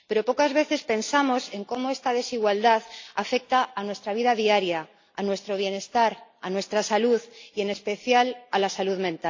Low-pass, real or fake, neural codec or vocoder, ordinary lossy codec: 7.2 kHz; real; none; AAC, 48 kbps